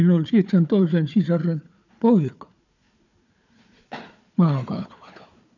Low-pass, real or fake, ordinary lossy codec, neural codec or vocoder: 7.2 kHz; fake; none; codec, 16 kHz, 4 kbps, FunCodec, trained on Chinese and English, 50 frames a second